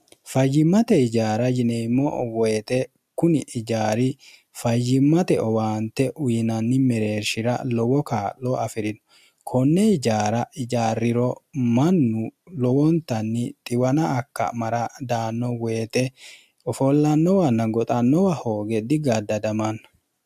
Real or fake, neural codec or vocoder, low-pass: real; none; 14.4 kHz